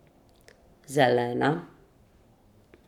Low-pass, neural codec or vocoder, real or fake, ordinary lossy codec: 19.8 kHz; codec, 44.1 kHz, 7.8 kbps, Pupu-Codec; fake; none